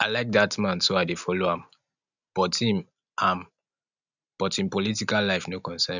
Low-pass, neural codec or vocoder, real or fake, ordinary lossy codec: 7.2 kHz; none; real; none